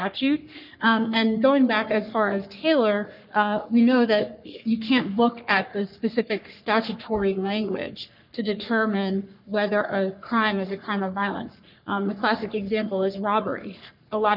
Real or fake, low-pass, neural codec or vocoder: fake; 5.4 kHz; codec, 44.1 kHz, 3.4 kbps, Pupu-Codec